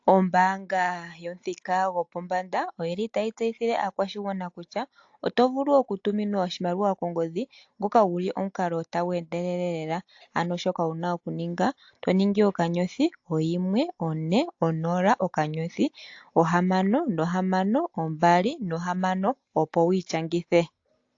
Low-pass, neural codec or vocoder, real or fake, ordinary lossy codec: 7.2 kHz; none; real; AAC, 48 kbps